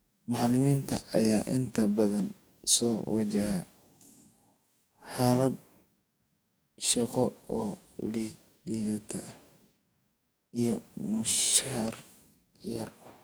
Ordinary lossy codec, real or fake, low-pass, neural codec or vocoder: none; fake; none; codec, 44.1 kHz, 2.6 kbps, DAC